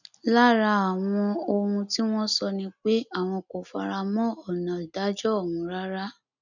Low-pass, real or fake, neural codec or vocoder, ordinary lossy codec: 7.2 kHz; real; none; none